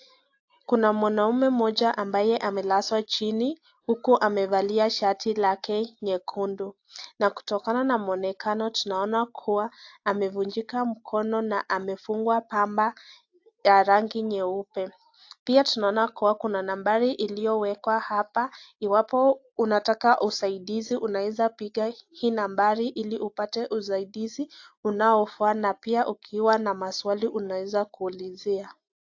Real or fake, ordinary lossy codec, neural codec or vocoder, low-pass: real; AAC, 48 kbps; none; 7.2 kHz